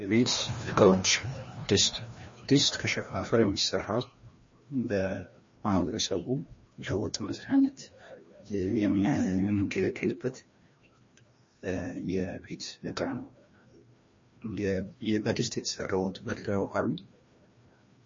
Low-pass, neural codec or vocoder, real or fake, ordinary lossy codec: 7.2 kHz; codec, 16 kHz, 1 kbps, FreqCodec, larger model; fake; MP3, 32 kbps